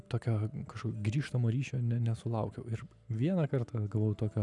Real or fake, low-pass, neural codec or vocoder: real; 10.8 kHz; none